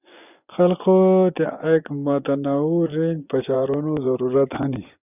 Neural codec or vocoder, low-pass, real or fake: vocoder, 24 kHz, 100 mel bands, Vocos; 3.6 kHz; fake